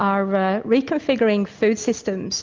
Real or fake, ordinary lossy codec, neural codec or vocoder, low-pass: real; Opus, 24 kbps; none; 7.2 kHz